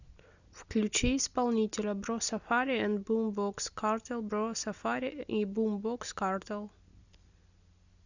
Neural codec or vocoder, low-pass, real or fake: none; 7.2 kHz; real